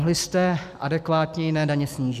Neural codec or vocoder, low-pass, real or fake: none; 14.4 kHz; real